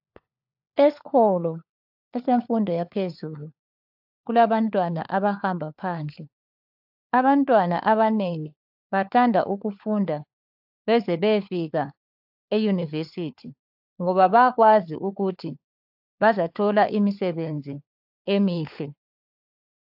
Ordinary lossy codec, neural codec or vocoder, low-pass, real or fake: AAC, 48 kbps; codec, 16 kHz, 4 kbps, FunCodec, trained on LibriTTS, 50 frames a second; 5.4 kHz; fake